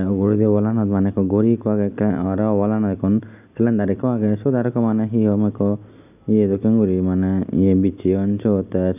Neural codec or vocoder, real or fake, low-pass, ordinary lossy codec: none; real; 3.6 kHz; AAC, 32 kbps